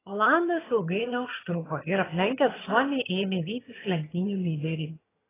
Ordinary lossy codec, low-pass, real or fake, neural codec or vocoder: AAC, 16 kbps; 3.6 kHz; fake; vocoder, 22.05 kHz, 80 mel bands, HiFi-GAN